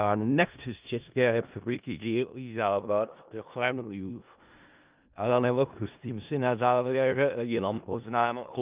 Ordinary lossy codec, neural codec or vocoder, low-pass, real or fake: Opus, 24 kbps; codec, 16 kHz in and 24 kHz out, 0.4 kbps, LongCat-Audio-Codec, four codebook decoder; 3.6 kHz; fake